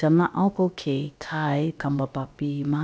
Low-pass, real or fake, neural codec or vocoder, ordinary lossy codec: none; fake; codec, 16 kHz, about 1 kbps, DyCAST, with the encoder's durations; none